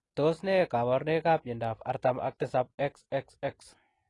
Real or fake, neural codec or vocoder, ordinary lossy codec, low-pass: real; none; AAC, 32 kbps; 10.8 kHz